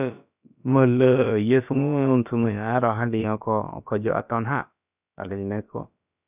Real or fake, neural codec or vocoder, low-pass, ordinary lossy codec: fake; codec, 16 kHz, about 1 kbps, DyCAST, with the encoder's durations; 3.6 kHz; none